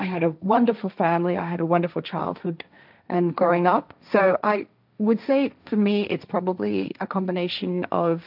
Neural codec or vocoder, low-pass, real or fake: codec, 16 kHz, 1.1 kbps, Voila-Tokenizer; 5.4 kHz; fake